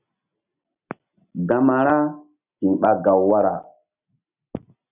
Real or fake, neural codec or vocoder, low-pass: real; none; 3.6 kHz